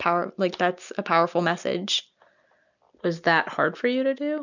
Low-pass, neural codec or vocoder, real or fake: 7.2 kHz; none; real